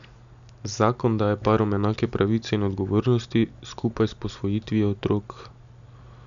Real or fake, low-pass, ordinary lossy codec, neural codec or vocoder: real; 7.2 kHz; none; none